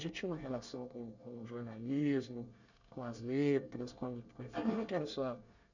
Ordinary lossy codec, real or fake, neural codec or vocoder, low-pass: none; fake; codec, 24 kHz, 1 kbps, SNAC; 7.2 kHz